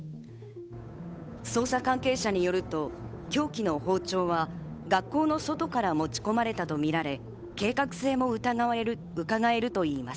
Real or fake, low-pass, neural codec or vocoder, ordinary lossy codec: fake; none; codec, 16 kHz, 8 kbps, FunCodec, trained on Chinese and English, 25 frames a second; none